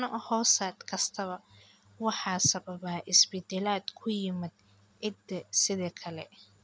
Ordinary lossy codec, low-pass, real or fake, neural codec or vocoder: none; none; real; none